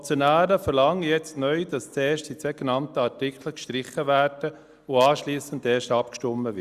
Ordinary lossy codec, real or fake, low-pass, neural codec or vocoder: Opus, 64 kbps; real; 14.4 kHz; none